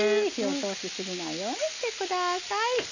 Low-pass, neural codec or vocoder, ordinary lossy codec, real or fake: 7.2 kHz; none; none; real